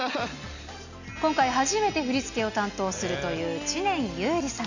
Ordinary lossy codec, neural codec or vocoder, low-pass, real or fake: none; none; 7.2 kHz; real